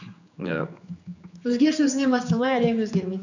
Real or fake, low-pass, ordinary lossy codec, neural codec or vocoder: fake; 7.2 kHz; none; codec, 16 kHz, 4 kbps, X-Codec, HuBERT features, trained on general audio